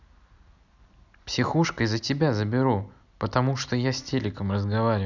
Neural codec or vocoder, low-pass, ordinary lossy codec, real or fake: none; 7.2 kHz; none; real